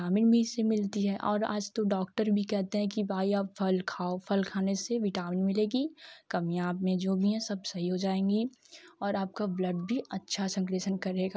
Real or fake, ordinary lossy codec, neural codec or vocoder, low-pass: real; none; none; none